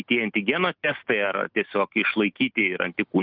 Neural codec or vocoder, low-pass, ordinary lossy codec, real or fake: none; 5.4 kHz; Opus, 24 kbps; real